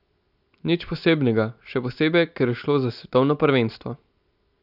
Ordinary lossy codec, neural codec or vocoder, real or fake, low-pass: none; none; real; 5.4 kHz